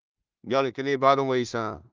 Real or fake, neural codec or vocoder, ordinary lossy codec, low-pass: fake; codec, 16 kHz in and 24 kHz out, 0.4 kbps, LongCat-Audio-Codec, two codebook decoder; Opus, 24 kbps; 7.2 kHz